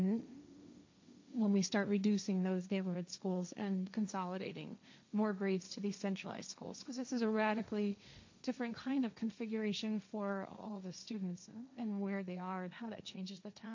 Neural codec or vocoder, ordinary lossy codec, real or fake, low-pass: codec, 16 kHz, 1.1 kbps, Voila-Tokenizer; MP3, 64 kbps; fake; 7.2 kHz